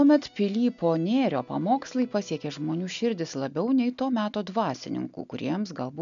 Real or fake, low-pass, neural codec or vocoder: real; 7.2 kHz; none